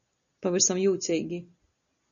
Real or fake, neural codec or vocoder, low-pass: real; none; 7.2 kHz